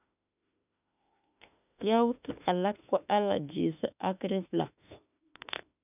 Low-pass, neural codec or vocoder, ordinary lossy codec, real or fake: 3.6 kHz; autoencoder, 48 kHz, 32 numbers a frame, DAC-VAE, trained on Japanese speech; none; fake